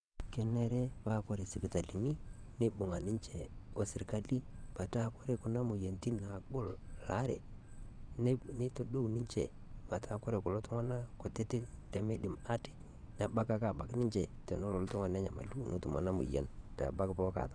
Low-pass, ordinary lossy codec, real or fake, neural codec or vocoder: 9.9 kHz; none; fake; vocoder, 22.05 kHz, 80 mel bands, WaveNeXt